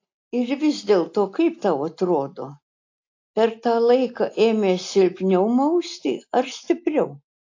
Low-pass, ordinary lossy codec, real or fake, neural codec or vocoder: 7.2 kHz; AAC, 48 kbps; real; none